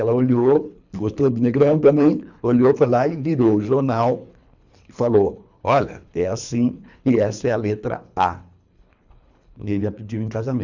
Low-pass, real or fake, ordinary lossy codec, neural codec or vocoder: 7.2 kHz; fake; none; codec, 24 kHz, 3 kbps, HILCodec